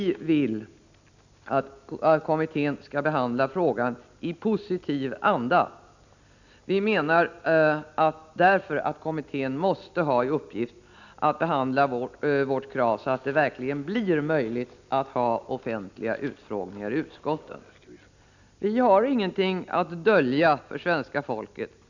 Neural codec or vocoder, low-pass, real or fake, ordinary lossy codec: none; 7.2 kHz; real; none